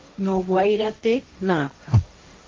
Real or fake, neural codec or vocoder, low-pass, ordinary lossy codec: fake; codec, 16 kHz, 1.1 kbps, Voila-Tokenizer; 7.2 kHz; Opus, 32 kbps